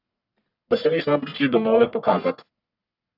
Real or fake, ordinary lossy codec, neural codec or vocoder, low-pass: fake; AAC, 48 kbps; codec, 44.1 kHz, 1.7 kbps, Pupu-Codec; 5.4 kHz